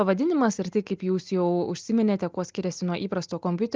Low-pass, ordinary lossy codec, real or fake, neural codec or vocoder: 7.2 kHz; Opus, 32 kbps; real; none